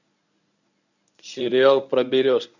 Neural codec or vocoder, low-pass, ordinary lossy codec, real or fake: codec, 24 kHz, 0.9 kbps, WavTokenizer, medium speech release version 1; 7.2 kHz; none; fake